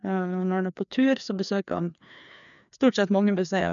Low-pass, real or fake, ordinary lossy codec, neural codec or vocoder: 7.2 kHz; fake; none; codec, 16 kHz, 2 kbps, FreqCodec, larger model